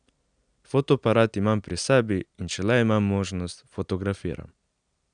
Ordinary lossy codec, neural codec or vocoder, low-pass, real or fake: none; none; 9.9 kHz; real